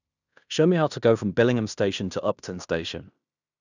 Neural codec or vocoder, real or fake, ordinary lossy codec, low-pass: codec, 16 kHz in and 24 kHz out, 0.9 kbps, LongCat-Audio-Codec, fine tuned four codebook decoder; fake; none; 7.2 kHz